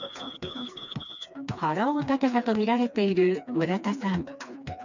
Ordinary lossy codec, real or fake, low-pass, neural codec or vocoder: none; fake; 7.2 kHz; codec, 16 kHz, 2 kbps, FreqCodec, smaller model